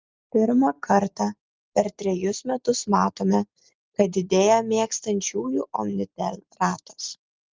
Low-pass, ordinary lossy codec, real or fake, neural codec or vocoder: 7.2 kHz; Opus, 32 kbps; real; none